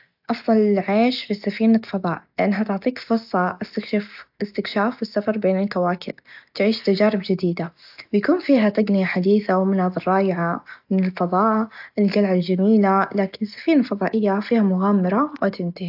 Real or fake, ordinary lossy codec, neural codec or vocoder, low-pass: real; AAC, 48 kbps; none; 5.4 kHz